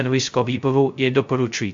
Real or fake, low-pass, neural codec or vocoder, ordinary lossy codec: fake; 7.2 kHz; codec, 16 kHz, 0.2 kbps, FocalCodec; MP3, 48 kbps